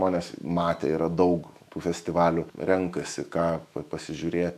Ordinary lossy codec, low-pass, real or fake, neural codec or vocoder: MP3, 96 kbps; 14.4 kHz; fake; autoencoder, 48 kHz, 128 numbers a frame, DAC-VAE, trained on Japanese speech